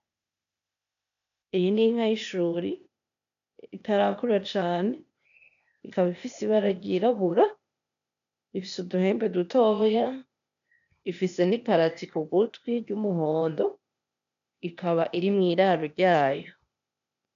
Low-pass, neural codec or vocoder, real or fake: 7.2 kHz; codec, 16 kHz, 0.8 kbps, ZipCodec; fake